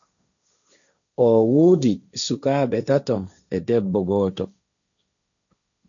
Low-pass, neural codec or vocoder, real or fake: 7.2 kHz; codec, 16 kHz, 1.1 kbps, Voila-Tokenizer; fake